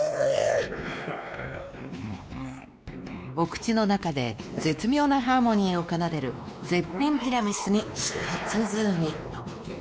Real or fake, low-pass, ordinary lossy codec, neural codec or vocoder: fake; none; none; codec, 16 kHz, 2 kbps, X-Codec, WavLM features, trained on Multilingual LibriSpeech